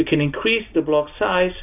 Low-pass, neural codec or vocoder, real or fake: 3.6 kHz; none; real